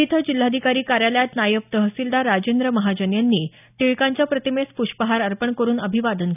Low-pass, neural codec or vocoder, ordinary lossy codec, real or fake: 3.6 kHz; none; none; real